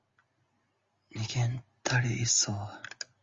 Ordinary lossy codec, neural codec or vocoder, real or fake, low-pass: Opus, 32 kbps; none; real; 7.2 kHz